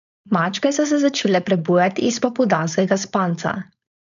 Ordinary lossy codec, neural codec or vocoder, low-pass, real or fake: none; codec, 16 kHz, 4.8 kbps, FACodec; 7.2 kHz; fake